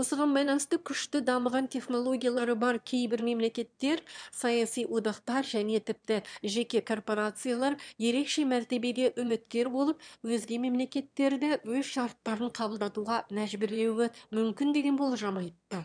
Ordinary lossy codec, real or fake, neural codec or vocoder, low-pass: none; fake; autoencoder, 22.05 kHz, a latent of 192 numbers a frame, VITS, trained on one speaker; 9.9 kHz